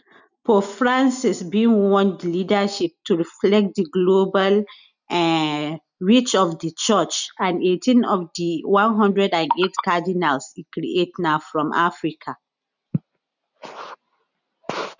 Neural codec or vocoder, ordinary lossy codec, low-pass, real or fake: none; none; 7.2 kHz; real